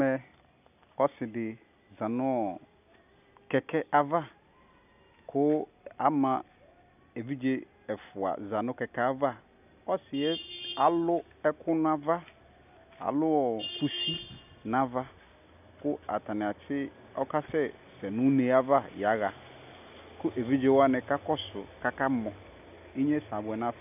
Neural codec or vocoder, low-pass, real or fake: none; 3.6 kHz; real